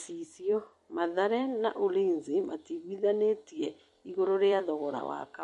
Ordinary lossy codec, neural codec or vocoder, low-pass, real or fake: MP3, 48 kbps; vocoder, 44.1 kHz, 128 mel bands every 256 samples, BigVGAN v2; 14.4 kHz; fake